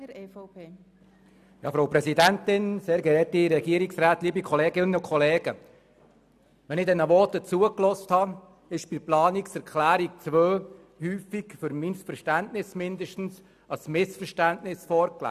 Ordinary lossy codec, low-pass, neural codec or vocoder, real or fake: none; 14.4 kHz; none; real